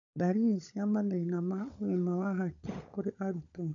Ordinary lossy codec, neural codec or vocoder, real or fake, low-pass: none; codec, 16 kHz, 16 kbps, FunCodec, trained on LibriTTS, 50 frames a second; fake; 7.2 kHz